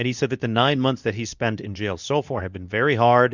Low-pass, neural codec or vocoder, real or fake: 7.2 kHz; codec, 24 kHz, 0.9 kbps, WavTokenizer, medium speech release version 2; fake